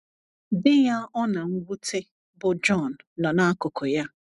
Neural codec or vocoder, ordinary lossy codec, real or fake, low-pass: none; none; real; 10.8 kHz